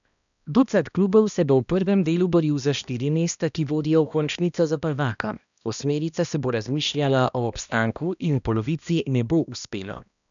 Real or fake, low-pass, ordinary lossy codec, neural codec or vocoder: fake; 7.2 kHz; none; codec, 16 kHz, 1 kbps, X-Codec, HuBERT features, trained on balanced general audio